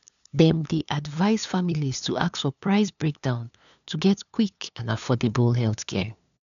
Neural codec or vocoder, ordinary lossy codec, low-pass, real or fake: codec, 16 kHz, 2 kbps, FunCodec, trained on LibriTTS, 25 frames a second; none; 7.2 kHz; fake